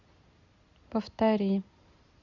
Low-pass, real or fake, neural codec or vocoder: 7.2 kHz; real; none